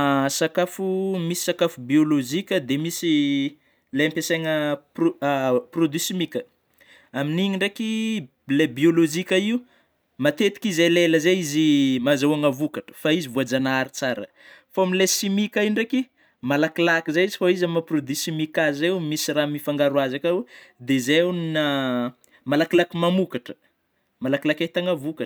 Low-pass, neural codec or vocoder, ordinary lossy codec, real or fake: none; none; none; real